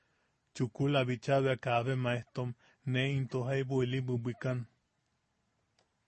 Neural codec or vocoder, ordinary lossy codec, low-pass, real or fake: none; MP3, 32 kbps; 10.8 kHz; real